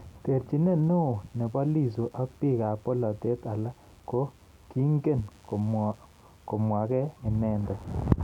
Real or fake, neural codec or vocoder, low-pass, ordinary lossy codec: real; none; 19.8 kHz; none